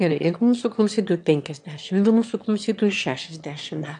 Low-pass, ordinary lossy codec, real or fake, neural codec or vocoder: 9.9 kHz; AAC, 64 kbps; fake; autoencoder, 22.05 kHz, a latent of 192 numbers a frame, VITS, trained on one speaker